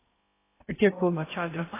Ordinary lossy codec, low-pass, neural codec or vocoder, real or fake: AAC, 16 kbps; 3.6 kHz; codec, 16 kHz in and 24 kHz out, 0.6 kbps, FocalCodec, streaming, 4096 codes; fake